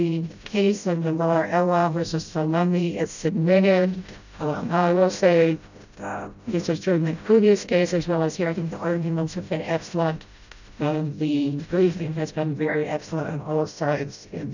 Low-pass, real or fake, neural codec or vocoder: 7.2 kHz; fake; codec, 16 kHz, 0.5 kbps, FreqCodec, smaller model